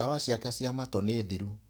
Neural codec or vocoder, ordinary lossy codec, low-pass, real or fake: codec, 44.1 kHz, 2.6 kbps, SNAC; none; none; fake